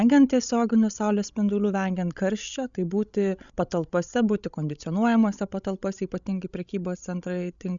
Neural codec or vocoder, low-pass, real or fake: codec, 16 kHz, 16 kbps, FreqCodec, larger model; 7.2 kHz; fake